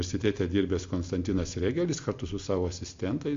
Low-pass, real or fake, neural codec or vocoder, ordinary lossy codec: 7.2 kHz; real; none; MP3, 48 kbps